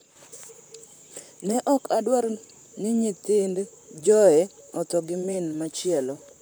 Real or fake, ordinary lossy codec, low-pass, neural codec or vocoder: fake; none; none; vocoder, 44.1 kHz, 128 mel bands, Pupu-Vocoder